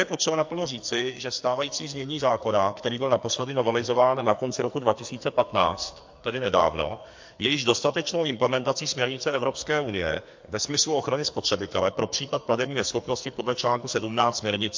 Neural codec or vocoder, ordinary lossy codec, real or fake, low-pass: codec, 44.1 kHz, 2.6 kbps, SNAC; MP3, 48 kbps; fake; 7.2 kHz